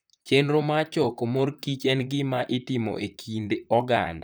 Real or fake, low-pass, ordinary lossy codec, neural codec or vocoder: fake; none; none; vocoder, 44.1 kHz, 128 mel bands, Pupu-Vocoder